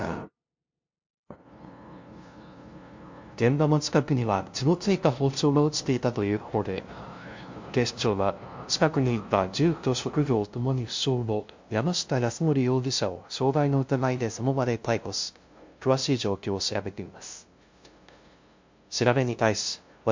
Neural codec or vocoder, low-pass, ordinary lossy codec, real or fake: codec, 16 kHz, 0.5 kbps, FunCodec, trained on LibriTTS, 25 frames a second; 7.2 kHz; MP3, 48 kbps; fake